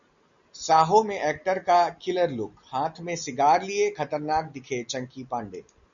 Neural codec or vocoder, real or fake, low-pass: none; real; 7.2 kHz